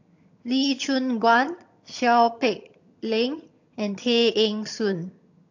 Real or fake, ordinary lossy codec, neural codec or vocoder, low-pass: fake; AAC, 48 kbps; vocoder, 22.05 kHz, 80 mel bands, HiFi-GAN; 7.2 kHz